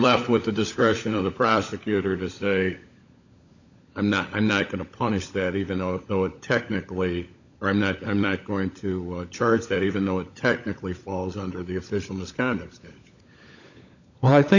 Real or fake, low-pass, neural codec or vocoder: fake; 7.2 kHz; codec, 16 kHz, 16 kbps, FunCodec, trained on LibriTTS, 50 frames a second